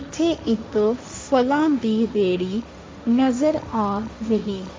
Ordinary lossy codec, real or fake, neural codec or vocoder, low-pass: none; fake; codec, 16 kHz, 1.1 kbps, Voila-Tokenizer; none